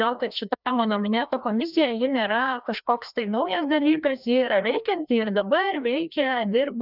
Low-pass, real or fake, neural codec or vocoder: 5.4 kHz; fake; codec, 16 kHz, 1 kbps, FreqCodec, larger model